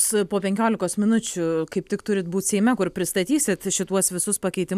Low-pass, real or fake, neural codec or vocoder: 14.4 kHz; real; none